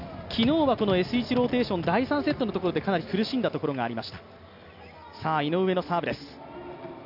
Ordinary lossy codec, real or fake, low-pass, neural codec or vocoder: none; real; 5.4 kHz; none